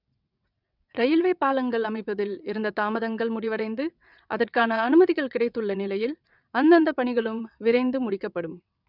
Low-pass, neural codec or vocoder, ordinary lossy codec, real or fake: 5.4 kHz; vocoder, 22.05 kHz, 80 mel bands, WaveNeXt; none; fake